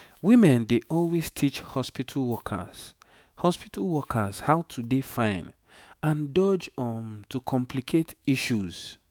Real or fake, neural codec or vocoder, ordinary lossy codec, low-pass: fake; autoencoder, 48 kHz, 128 numbers a frame, DAC-VAE, trained on Japanese speech; none; none